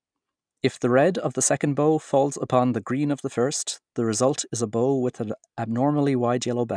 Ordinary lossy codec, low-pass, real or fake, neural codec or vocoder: none; 9.9 kHz; real; none